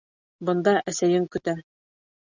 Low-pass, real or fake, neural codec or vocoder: 7.2 kHz; real; none